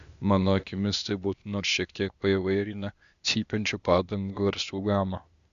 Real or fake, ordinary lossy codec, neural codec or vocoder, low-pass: fake; Opus, 64 kbps; codec, 16 kHz, 0.8 kbps, ZipCodec; 7.2 kHz